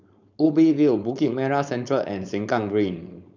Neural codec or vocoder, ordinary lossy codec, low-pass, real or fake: codec, 16 kHz, 4.8 kbps, FACodec; none; 7.2 kHz; fake